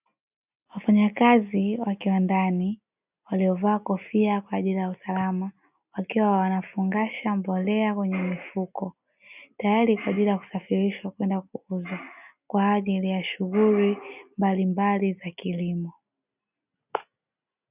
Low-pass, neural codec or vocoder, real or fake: 3.6 kHz; none; real